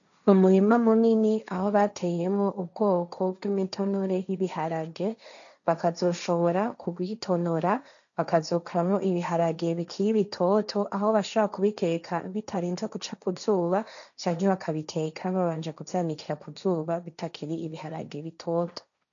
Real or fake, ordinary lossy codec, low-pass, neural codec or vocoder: fake; MP3, 96 kbps; 7.2 kHz; codec, 16 kHz, 1.1 kbps, Voila-Tokenizer